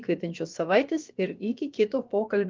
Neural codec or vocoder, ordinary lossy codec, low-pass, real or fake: codec, 16 kHz, about 1 kbps, DyCAST, with the encoder's durations; Opus, 32 kbps; 7.2 kHz; fake